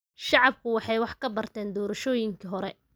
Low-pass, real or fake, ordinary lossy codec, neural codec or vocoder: none; real; none; none